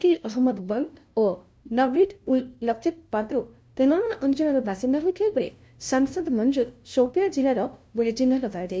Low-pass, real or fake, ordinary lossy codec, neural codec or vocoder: none; fake; none; codec, 16 kHz, 0.5 kbps, FunCodec, trained on LibriTTS, 25 frames a second